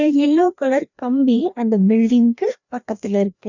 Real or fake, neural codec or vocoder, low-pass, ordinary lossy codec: fake; codec, 16 kHz, 1 kbps, FreqCodec, larger model; 7.2 kHz; none